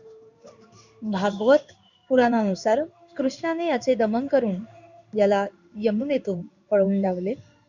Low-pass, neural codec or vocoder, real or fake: 7.2 kHz; codec, 16 kHz in and 24 kHz out, 1 kbps, XY-Tokenizer; fake